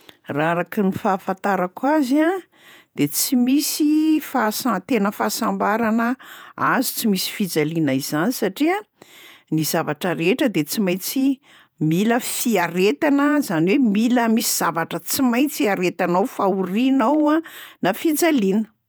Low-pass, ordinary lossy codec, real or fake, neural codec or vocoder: none; none; fake; vocoder, 48 kHz, 128 mel bands, Vocos